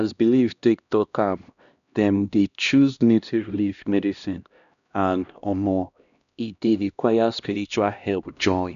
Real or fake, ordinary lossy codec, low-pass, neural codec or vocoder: fake; none; 7.2 kHz; codec, 16 kHz, 1 kbps, X-Codec, HuBERT features, trained on LibriSpeech